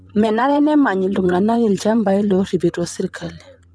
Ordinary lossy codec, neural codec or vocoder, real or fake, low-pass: none; vocoder, 22.05 kHz, 80 mel bands, WaveNeXt; fake; none